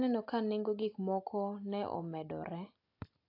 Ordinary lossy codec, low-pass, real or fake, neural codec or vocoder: AAC, 32 kbps; 5.4 kHz; real; none